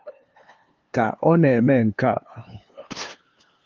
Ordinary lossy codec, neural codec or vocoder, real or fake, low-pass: Opus, 32 kbps; codec, 16 kHz, 2 kbps, FunCodec, trained on LibriTTS, 25 frames a second; fake; 7.2 kHz